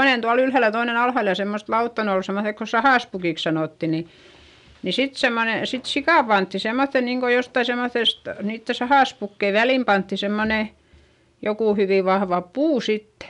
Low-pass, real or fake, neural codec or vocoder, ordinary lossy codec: 10.8 kHz; real; none; none